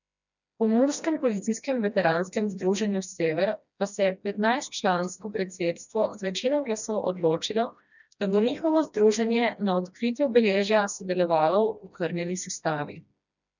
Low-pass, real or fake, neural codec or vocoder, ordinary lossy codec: 7.2 kHz; fake; codec, 16 kHz, 1 kbps, FreqCodec, smaller model; none